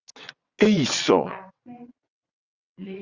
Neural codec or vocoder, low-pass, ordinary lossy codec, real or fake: vocoder, 22.05 kHz, 80 mel bands, WaveNeXt; 7.2 kHz; Opus, 64 kbps; fake